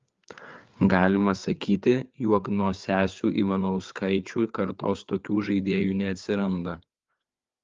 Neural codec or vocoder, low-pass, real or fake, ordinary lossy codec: codec, 16 kHz, 4 kbps, FreqCodec, larger model; 7.2 kHz; fake; Opus, 32 kbps